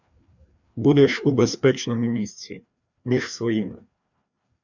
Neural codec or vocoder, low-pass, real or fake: codec, 16 kHz, 2 kbps, FreqCodec, larger model; 7.2 kHz; fake